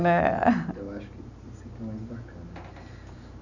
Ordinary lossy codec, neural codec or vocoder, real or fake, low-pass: none; none; real; 7.2 kHz